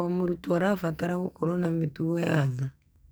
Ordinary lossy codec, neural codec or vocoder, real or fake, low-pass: none; codec, 44.1 kHz, 2.6 kbps, SNAC; fake; none